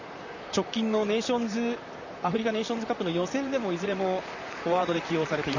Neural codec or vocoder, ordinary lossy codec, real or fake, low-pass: vocoder, 44.1 kHz, 128 mel bands, Pupu-Vocoder; none; fake; 7.2 kHz